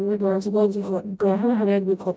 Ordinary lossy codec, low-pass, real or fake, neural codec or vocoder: none; none; fake; codec, 16 kHz, 0.5 kbps, FreqCodec, smaller model